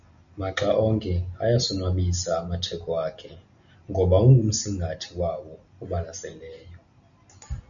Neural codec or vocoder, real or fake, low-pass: none; real; 7.2 kHz